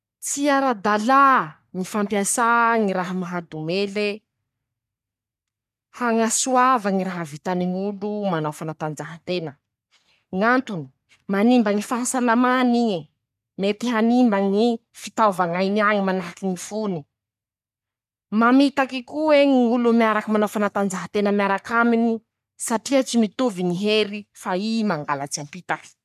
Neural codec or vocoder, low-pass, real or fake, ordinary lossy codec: codec, 44.1 kHz, 3.4 kbps, Pupu-Codec; 14.4 kHz; fake; none